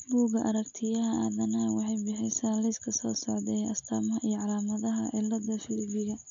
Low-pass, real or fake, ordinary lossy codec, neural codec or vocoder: 7.2 kHz; real; none; none